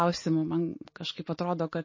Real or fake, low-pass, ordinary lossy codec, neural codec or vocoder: real; 7.2 kHz; MP3, 32 kbps; none